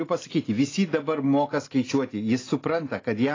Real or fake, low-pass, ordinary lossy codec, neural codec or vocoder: real; 7.2 kHz; AAC, 32 kbps; none